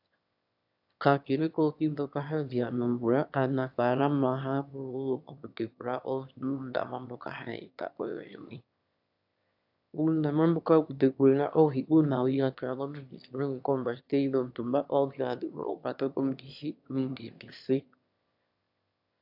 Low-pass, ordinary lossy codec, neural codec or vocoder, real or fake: 5.4 kHz; AAC, 48 kbps; autoencoder, 22.05 kHz, a latent of 192 numbers a frame, VITS, trained on one speaker; fake